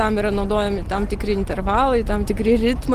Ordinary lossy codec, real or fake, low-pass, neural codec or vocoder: Opus, 16 kbps; real; 14.4 kHz; none